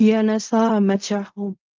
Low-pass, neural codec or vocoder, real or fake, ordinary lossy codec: 7.2 kHz; codec, 16 kHz in and 24 kHz out, 0.4 kbps, LongCat-Audio-Codec, fine tuned four codebook decoder; fake; Opus, 24 kbps